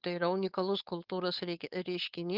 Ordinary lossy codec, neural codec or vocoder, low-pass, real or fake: Opus, 32 kbps; codec, 16 kHz, 4.8 kbps, FACodec; 5.4 kHz; fake